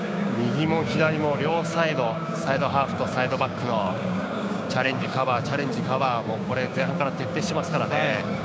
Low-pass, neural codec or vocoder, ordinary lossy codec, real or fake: none; codec, 16 kHz, 6 kbps, DAC; none; fake